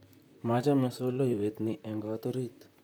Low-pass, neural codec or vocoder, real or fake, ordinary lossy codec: none; vocoder, 44.1 kHz, 128 mel bands, Pupu-Vocoder; fake; none